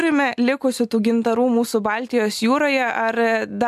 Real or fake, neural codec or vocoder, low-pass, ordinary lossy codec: real; none; 14.4 kHz; MP3, 96 kbps